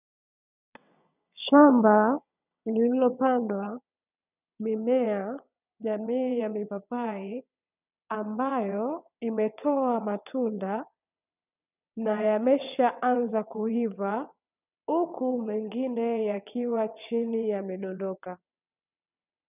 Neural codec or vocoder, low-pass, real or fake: vocoder, 22.05 kHz, 80 mel bands, WaveNeXt; 3.6 kHz; fake